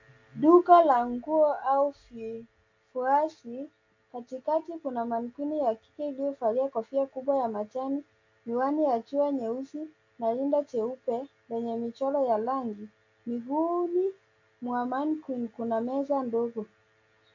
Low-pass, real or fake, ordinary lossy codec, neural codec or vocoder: 7.2 kHz; real; AAC, 48 kbps; none